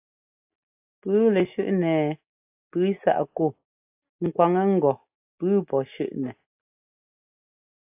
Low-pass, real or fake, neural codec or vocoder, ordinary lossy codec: 3.6 kHz; real; none; AAC, 32 kbps